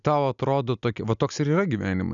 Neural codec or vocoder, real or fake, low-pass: none; real; 7.2 kHz